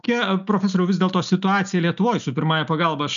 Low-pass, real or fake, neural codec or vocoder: 7.2 kHz; real; none